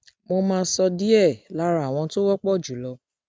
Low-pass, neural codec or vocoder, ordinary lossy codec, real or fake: none; none; none; real